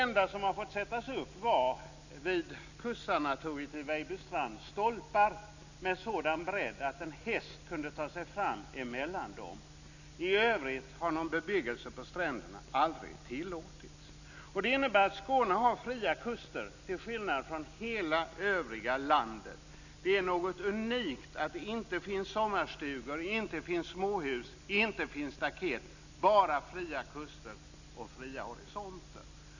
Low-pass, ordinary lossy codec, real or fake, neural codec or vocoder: 7.2 kHz; none; real; none